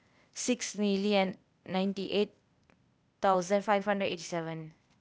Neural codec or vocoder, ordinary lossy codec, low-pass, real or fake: codec, 16 kHz, 0.8 kbps, ZipCodec; none; none; fake